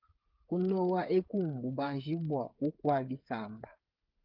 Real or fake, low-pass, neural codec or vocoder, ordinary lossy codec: fake; 5.4 kHz; codec, 16 kHz, 8 kbps, FreqCodec, smaller model; Opus, 32 kbps